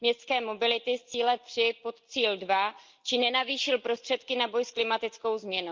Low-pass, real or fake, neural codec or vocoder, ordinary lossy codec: 7.2 kHz; real; none; Opus, 24 kbps